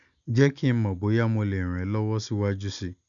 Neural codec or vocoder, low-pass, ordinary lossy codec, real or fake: none; 7.2 kHz; none; real